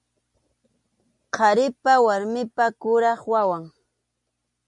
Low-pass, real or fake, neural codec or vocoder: 10.8 kHz; real; none